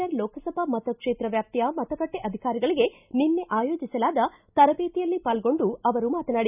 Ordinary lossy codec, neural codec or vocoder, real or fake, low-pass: none; none; real; 3.6 kHz